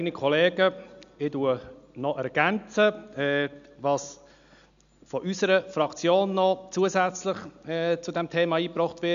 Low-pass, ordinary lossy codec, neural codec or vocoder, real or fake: 7.2 kHz; none; none; real